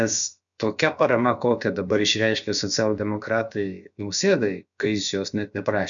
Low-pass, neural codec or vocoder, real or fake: 7.2 kHz; codec, 16 kHz, about 1 kbps, DyCAST, with the encoder's durations; fake